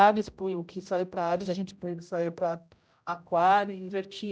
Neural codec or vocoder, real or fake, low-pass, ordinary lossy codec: codec, 16 kHz, 0.5 kbps, X-Codec, HuBERT features, trained on general audio; fake; none; none